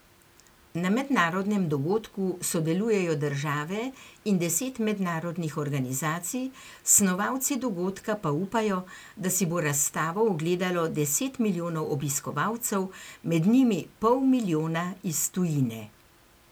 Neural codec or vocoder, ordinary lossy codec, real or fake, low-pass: none; none; real; none